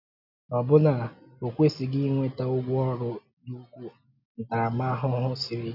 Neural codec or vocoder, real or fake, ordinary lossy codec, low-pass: none; real; none; 5.4 kHz